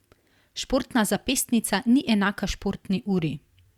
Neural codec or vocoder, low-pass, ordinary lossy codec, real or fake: vocoder, 44.1 kHz, 128 mel bands every 256 samples, BigVGAN v2; 19.8 kHz; Opus, 64 kbps; fake